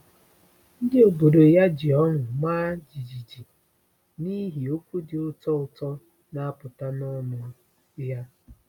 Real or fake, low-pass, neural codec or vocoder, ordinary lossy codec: real; 19.8 kHz; none; none